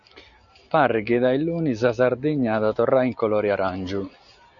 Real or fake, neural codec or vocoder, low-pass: real; none; 7.2 kHz